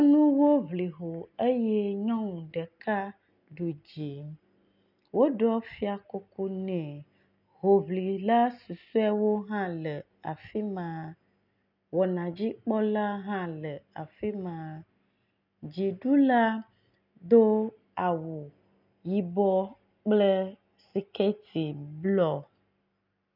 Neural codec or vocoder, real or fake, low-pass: none; real; 5.4 kHz